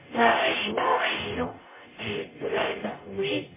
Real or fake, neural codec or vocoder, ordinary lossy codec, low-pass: fake; codec, 44.1 kHz, 0.9 kbps, DAC; MP3, 16 kbps; 3.6 kHz